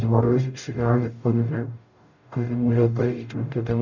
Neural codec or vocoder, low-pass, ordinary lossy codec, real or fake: codec, 44.1 kHz, 0.9 kbps, DAC; 7.2 kHz; none; fake